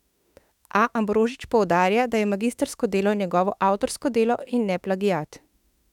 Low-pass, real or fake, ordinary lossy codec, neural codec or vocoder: 19.8 kHz; fake; none; autoencoder, 48 kHz, 32 numbers a frame, DAC-VAE, trained on Japanese speech